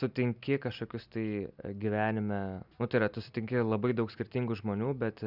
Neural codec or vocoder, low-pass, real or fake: none; 5.4 kHz; real